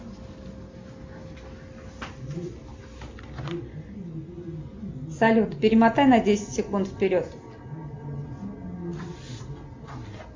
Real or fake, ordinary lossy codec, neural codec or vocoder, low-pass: real; MP3, 48 kbps; none; 7.2 kHz